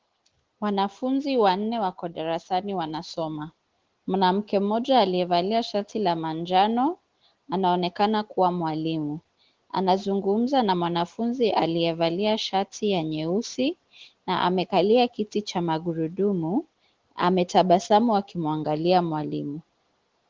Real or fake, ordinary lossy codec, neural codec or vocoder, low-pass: real; Opus, 16 kbps; none; 7.2 kHz